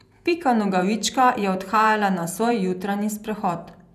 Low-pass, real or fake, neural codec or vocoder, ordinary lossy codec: 14.4 kHz; fake; vocoder, 48 kHz, 128 mel bands, Vocos; none